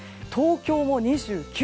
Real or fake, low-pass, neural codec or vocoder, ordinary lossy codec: real; none; none; none